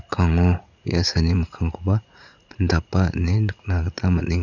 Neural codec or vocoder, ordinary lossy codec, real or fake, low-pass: vocoder, 44.1 kHz, 80 mel bands, Vocos; none; fake; 7.2 kHz